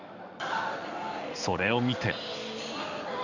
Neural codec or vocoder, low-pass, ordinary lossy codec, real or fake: codec, 16 kHz in and 24 kHz out, 1 kbps, XY-Tokenizer; 7.2 kHz; none; fake